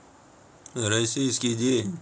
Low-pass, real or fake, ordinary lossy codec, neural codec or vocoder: none; real; none; none